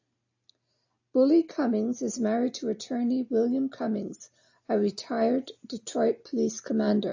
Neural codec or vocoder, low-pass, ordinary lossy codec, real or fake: none; 7.2 kHz; AAC, 48 kbps; real